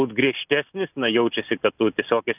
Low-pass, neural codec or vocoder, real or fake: 3.6 kHz; none; real